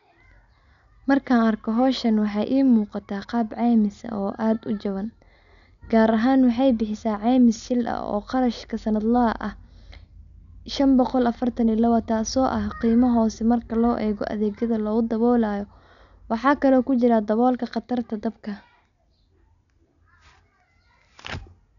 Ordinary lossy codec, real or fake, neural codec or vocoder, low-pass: none; real; none; 7.2 kHz